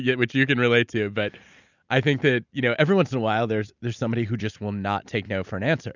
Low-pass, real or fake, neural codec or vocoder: 7.2 kHz; real; none